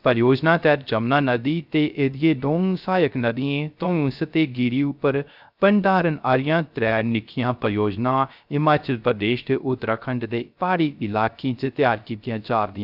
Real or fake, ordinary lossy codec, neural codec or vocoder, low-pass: fake; none; codec, 16 kHz, 0.3 kbps, FocalCodec; 5.4 kHz